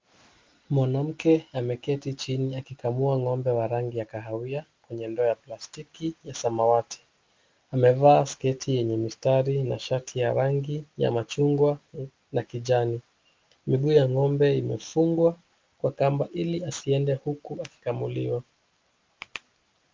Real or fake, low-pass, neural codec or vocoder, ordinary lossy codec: real; 7.2 kHz; none; Opus, 24 kbps